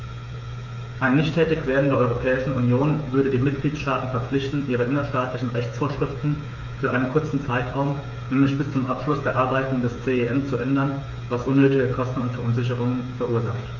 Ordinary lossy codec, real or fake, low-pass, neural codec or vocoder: none; fake; 7.2 kHz; codec, 16 kHz, 8 kbps, FreqCodec, smaller model